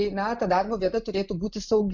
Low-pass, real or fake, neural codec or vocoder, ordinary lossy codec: 7.2 kHz; real; none; MP3, 64 kbps